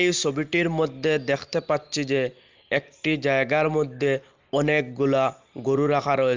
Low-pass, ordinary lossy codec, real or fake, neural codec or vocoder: 7.2 kHz; Opus, 32 kbps; real; none